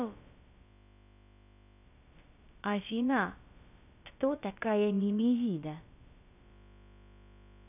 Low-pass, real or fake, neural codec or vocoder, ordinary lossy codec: 3.6 kHz; fake; codec, 16 kHz, about 1 kbps, DyCAST, with the encoder's durations; none